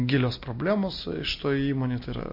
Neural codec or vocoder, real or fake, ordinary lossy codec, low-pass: none; real; MP3, 32 kbps; 5.4 kHz